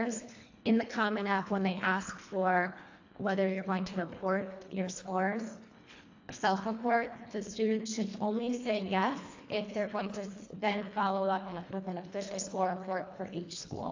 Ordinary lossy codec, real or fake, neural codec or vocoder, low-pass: AAC, 48 kbps; fake; codec, 24 kHz, 1.5 kbps, HILCodec; 7.2 kHz